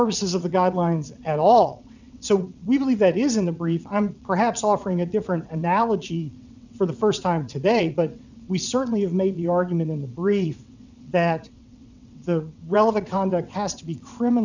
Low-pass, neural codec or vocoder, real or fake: 7.2 kHz; vocoder, 22.05 kHz, 80 mel bands, WaveNeXt; fake